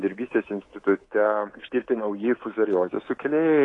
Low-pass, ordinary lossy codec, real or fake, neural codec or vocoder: 10.8 kHz; AAC, 32 kbps; fake; codec, 24 kHz, 3.1 kbps, DualCodec